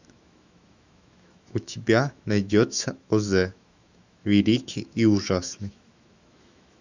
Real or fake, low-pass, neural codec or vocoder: fake; 7.2 kHz; autoencoder, 48 kHz, 128 numbers a frame, DAC-VAE, trained on Japanese speech